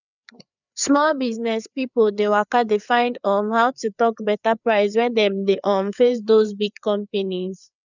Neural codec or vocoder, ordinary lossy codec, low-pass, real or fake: codec, 16 kHz, 4 kbps, FreqCodec, larger model; none; 7.2 kHz; fake